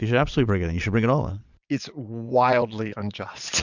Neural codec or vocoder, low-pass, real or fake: vocoder, 22.05 kHz, 80 mel bands, Vocos; 7.2 kHz; fake